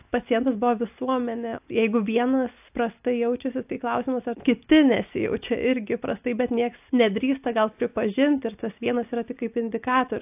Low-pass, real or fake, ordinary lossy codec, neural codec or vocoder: 3.6 kHz; real; AAC, 32 kbps; none